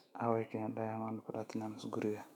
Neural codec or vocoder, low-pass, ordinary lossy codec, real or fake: autoencoder, 48 kHz, 128 numbers a frame, DAC-VAE, trained on Japanese speech; 19.8 kHz; none; fake